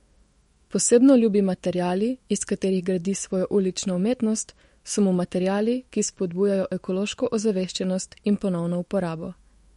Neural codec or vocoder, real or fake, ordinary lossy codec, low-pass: autoencoder, 48 kHz, 128 numbers a frame, DAC-VAE, trained on Japanese speech; fake; MP3, 48 kbps; 19.8 kHz